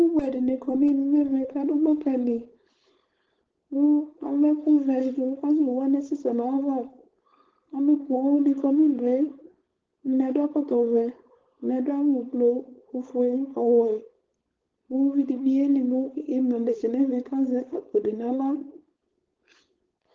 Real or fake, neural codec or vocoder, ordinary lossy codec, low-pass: fake; codec, 16 kHz, 4.8 kbps, FACodec; Opus, 16 kbps; 7.2 kHz